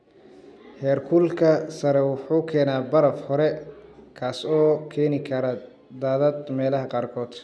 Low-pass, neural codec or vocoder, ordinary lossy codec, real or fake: none; none; none; real